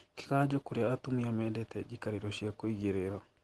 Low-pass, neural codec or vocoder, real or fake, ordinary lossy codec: 10.8 kHz; none; real; Opus, 16 kbps